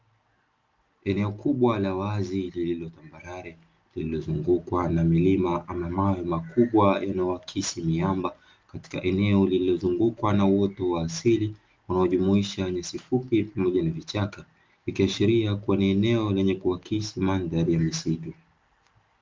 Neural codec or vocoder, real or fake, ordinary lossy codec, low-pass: none; real; Opus, 32 kbps; 7.2 kHz